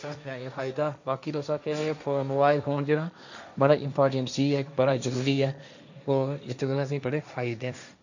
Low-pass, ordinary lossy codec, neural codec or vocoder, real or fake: 7.2 kHz; none; codec, 16 kHz, 1.1 kbps, Voila-Tokenizer; fake